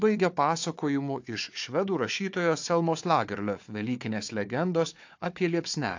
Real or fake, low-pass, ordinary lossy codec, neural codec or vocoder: fake; 7.2 kHz; AAC, 48 kbps; codec, 16 kHz, 4 kbps, FunCodec, trained on Chinese and English, 50 frames a second